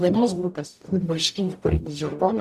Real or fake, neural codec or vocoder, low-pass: fake; codec, 44.1 kHz, 0.9 kbps, DAC; 14.4 kHz